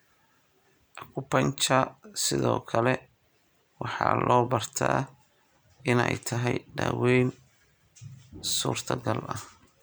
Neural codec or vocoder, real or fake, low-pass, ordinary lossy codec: none; real; none; none